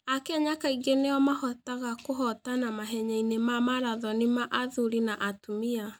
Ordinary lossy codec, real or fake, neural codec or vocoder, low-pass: none; real; none; none